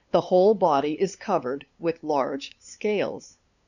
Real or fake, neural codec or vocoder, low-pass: fake; codec, 16 kHz, 2 kbps, FunCodec, trained on LibriTTS, 25 frames a second; 7.2 kHz